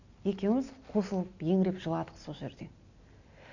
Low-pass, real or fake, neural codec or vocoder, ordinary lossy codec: 7.2 kHz; real; none; none